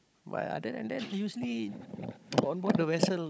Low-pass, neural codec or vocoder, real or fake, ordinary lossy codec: none; codec, 16 kHz, 16 kbps, FunCodec, trained on Chinese and English, 50 frames a second; fake; none